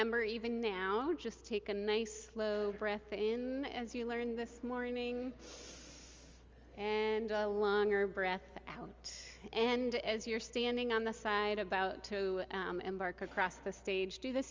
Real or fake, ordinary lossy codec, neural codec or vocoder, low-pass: real; Opus, 32 kbps; none; 7.2 kHz